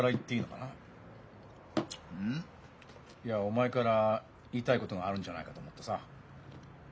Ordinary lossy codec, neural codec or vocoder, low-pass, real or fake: none; none; none; real